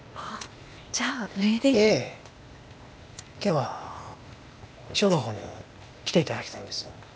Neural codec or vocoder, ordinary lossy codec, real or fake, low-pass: codec, 16 kHz, 0.8 kbps, ZipCodec; none; fake; none